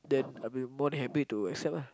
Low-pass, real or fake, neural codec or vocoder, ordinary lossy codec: none; real; none; none